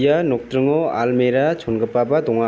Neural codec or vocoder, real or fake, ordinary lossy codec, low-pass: none; real; none; none